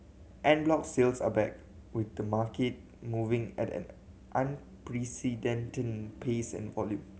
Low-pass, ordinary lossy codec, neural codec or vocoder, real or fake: none; none; none; real